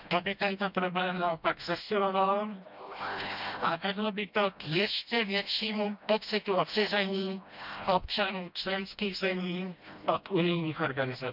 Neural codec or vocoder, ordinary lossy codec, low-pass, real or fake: codec, 16 kHz, 1 kbps, FreqCodec, smaller model; none; 5.4 kHz; fake